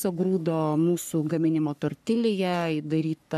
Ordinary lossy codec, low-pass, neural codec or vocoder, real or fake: AAC, 96 kbps; 14.4 kHz; codec, 44.1 kHz, 3.4 kbps, Pupu-Codec; fake